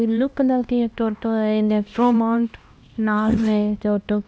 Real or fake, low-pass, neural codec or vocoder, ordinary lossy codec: fake; none; codec, 16 kHz, 1 kbps, X-Codec, HuBERT features, trained on LibriSpeech; none